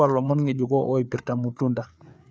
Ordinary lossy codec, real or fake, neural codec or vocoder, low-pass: none; fake; codec, 16 kHz, 4 kbps, FreqCodec, larger model; none